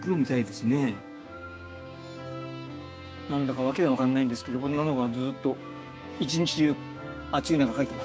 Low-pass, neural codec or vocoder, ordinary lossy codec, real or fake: none; codec, 16 kHz, 6 kbps, DAC; none; fake